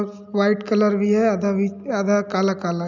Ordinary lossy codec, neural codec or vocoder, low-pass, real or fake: none; none; 7.2 kHz; real